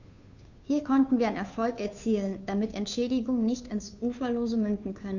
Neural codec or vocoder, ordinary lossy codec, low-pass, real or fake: codec, 16 kHz, 2 kbps, FunCodec, trained on Chinese and English, 25 frames a second; none; 7.2 kHz; fake